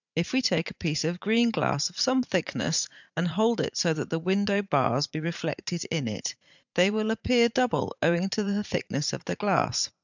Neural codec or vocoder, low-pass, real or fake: vocoder, 44.1 kHz, 80 mel bands, Vocos; 7.2 kHz; fake